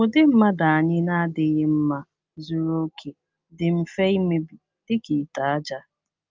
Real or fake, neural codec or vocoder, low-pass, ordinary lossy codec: real; none; 7.2 kHz; Opus, 24 kbps